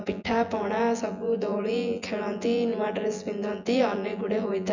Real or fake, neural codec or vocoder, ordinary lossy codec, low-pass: fake; vocoder, 24 kHz, 100 mel bands, Vocos; none; 7.2 kHz